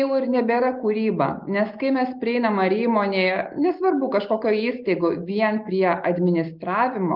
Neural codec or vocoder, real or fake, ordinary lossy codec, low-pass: none; real; Opus, 24 kbps; 5.4 kHz